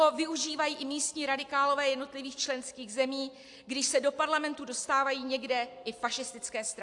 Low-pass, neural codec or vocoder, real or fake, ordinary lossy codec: 10.8 kHz; none; real; AAC, 64 kbps